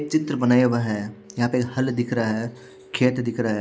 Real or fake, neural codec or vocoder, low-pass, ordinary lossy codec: real; none; none; none